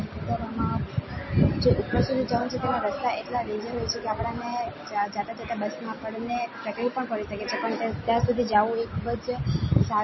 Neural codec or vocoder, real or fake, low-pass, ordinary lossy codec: none; real; 7.2 kHz; MP3, 24 kbps